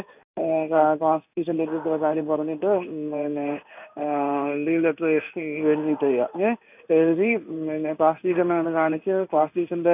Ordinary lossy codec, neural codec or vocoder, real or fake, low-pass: none; codec, 16 kHz in and 24 kHz out, 1 kbps, XY-Tokenizer; fake; 3.6 kHz